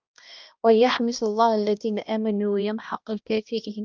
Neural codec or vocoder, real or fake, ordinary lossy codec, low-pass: codec, 16 kHz, 1 kbps, X-Codec, HuBERT features, trained on balanced general audio; fake; Opus, 24 kbps; 7.2 kHz